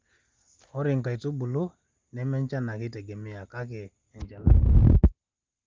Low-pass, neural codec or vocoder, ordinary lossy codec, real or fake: 7.2 kHz; none; Opus, 32 kbps; real